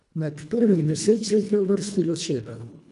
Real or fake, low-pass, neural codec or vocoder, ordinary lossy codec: fake; 10.8 kHz; codec, 24 kHz, 1.5 kbps, HILCodec; none